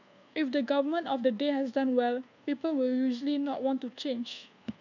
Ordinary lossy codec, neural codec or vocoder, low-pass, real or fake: none; codec, 24 kHz, 1.2 kbps, DualCodec; 7.2 kHz; fake